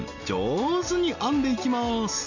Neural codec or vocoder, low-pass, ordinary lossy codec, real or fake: none; 7.2 kHz; none; real